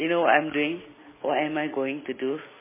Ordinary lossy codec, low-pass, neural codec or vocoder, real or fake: MP3, 16 kbps; 3.6 kHz; none; real